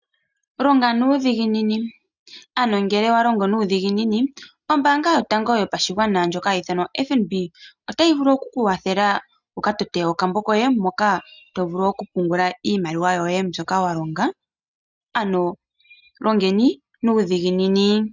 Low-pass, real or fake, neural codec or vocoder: 7.2 kHz; real; none